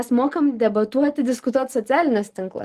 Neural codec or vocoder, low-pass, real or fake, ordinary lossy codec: none; 14.4 kHz; real; Opus, 24 kbps